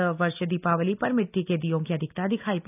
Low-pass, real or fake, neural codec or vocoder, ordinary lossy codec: 3.6 kHz; real; none; none